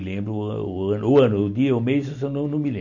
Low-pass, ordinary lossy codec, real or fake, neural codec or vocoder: 7.2 kHz; none; real; none